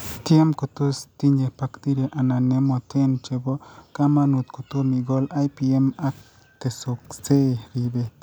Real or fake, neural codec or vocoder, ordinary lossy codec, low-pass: real; none; none; none